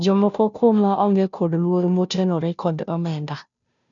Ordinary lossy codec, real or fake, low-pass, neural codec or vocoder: none; fake; 7.2 kHz; codec, 16 kHz, 0.5 kbps, FunCodec, trained on Chinese and English, 25 frames a second